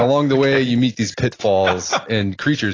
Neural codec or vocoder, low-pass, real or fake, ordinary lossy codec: none; 7.2 kHz; real; AAC, 32 kbps